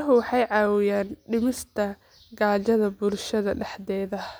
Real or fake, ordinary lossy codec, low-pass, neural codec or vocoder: real; none; none; none